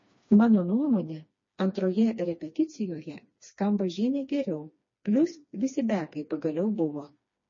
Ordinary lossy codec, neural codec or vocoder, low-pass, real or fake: MP3, 32 kbps; codec, 16 kHz, 2 kbps, FreqCodec, smaller model; 7.2 kHz; fake